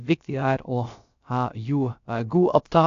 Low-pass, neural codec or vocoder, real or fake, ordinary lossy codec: 7.2 kHz; codec, 16 kHz, about 1 kbps, DyCAST, with the encoder's durations; fake; AAC, 64 kbps